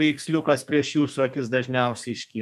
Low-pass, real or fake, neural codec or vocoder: 14.4 kHz; fake; codec, 32 kHz, 1.9 kbps, SNAC